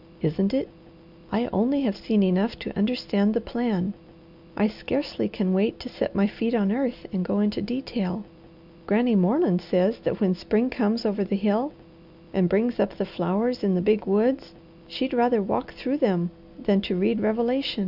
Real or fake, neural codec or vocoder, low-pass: real; none; 5.4 kHz